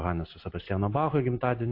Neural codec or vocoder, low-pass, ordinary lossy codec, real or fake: none; 5.4 kHz; AAC, 32 kbps; real